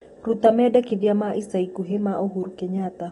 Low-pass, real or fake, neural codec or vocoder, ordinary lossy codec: 10.8 kHz; real; none; AAC, 32 kbps